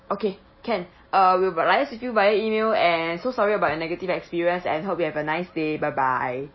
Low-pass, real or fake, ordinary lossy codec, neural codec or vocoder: 7.2 kHz; real; MP3, 24 kbps; none